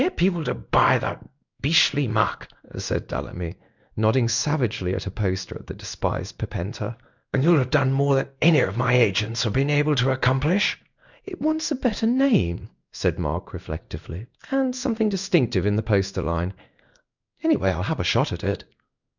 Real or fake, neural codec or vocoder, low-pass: fake; codec, 16 kHz in and 24 kHz out, 1 kbps, XY-Tokenizer; 7.2 kHz